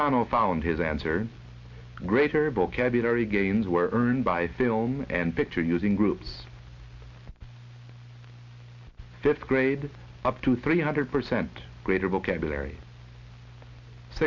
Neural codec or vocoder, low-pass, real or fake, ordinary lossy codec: none; 7.2 kHz; real; MP3, 48 kbps